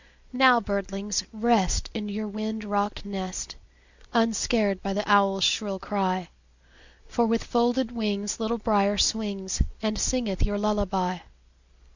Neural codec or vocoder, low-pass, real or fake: none; 7.2 kHz; real